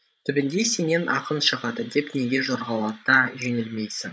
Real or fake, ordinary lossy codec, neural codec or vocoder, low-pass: fake; none; codec, 16 kHz, 16 kbps, FreqCodec, larger model; none